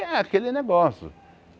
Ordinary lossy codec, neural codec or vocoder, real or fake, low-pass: none; none; real; none